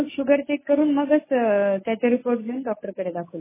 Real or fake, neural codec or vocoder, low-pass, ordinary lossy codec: real; none; 3.6 kHz; MP3, 16 kbps